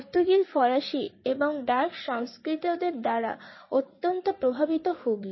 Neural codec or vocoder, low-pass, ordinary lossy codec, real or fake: codec, 16 kHz in and 24 kHz out, 2.2 kbps, FireRedTTS-2 codec; 7.2 kHz; MP3, 24 kbps; fake